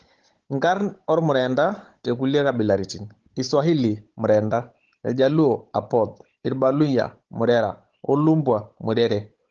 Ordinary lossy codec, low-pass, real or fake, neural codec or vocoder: Opus, 16 kbps; 7.2 kHz; fake; codec, 16 kHz, 16 kbps, FunCodec, trained on Chinese and English, 50 frames a second